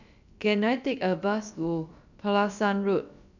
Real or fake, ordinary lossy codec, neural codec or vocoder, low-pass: fake; none; codec, 16 kHz, about 1 kbps, DyCAST, with the encoder's durations; 7.2 kHz